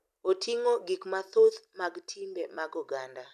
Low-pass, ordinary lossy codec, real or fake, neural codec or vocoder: 14.4 kHz; none; real; none